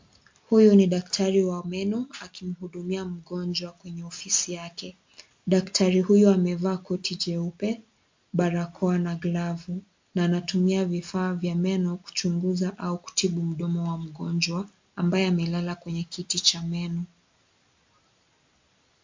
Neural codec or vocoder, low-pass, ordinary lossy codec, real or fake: none; 7.2 kHz; MP3, 48 kbps; real